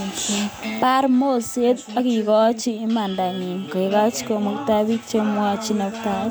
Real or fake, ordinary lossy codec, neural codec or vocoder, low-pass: real; none; none; none